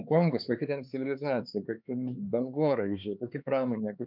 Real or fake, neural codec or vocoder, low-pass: fake; codec, 16 kHz, 4 kbps, X-Codec, HuBERT features, trained on LibriSpeech; 5.4 kHz